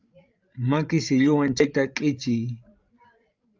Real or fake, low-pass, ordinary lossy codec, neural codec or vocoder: fake; 7.2 kHz; Opus, 24 kbps; codec, 16 kHz, 16 kbps, FreqCodec, larger model